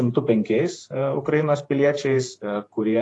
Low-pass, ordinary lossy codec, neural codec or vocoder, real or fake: 10.8 kHz; AAC, 48 kbps; vocoder, 48 kHz, 128 mel bands, Vocos; fake